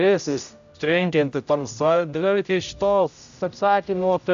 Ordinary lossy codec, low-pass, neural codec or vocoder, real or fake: AAC, 96 kbps; 7.2 kHz; codec, 16 kHz, 0.5 kbps, X-Codec, HuBERT features, trained on general audio; fake